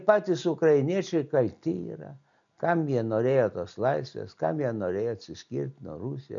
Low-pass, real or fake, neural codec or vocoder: 7.2 kHz; real; none